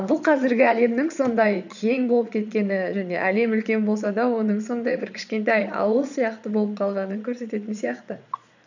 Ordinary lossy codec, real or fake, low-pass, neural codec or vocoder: none; fake; 7.2 kHz; vocoder, 22.05 kHz, 80 mel bands, Vocos